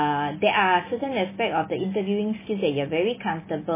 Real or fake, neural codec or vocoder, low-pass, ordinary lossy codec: real; none; 3.6 kHz; MP3, 16 kbps